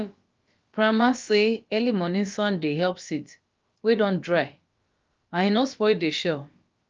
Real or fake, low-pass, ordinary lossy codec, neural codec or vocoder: fake; 7.2 kHz; Opus, 24 kbps; codec, 16 kHz, about 1 kbps, DyCAST, with the encoder's durations